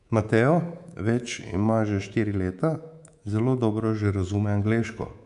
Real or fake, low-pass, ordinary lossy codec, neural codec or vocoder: fake; 10.8 kHz; MP3, 96 kbps; codec, 24 kHz, 3.1 kbps, DualCodec